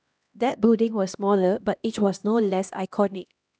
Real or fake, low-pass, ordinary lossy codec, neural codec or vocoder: fake; none; none; codec, 16 kHz, 1 kbps, X-Codec, HuBERT features, trained on LibriSpeech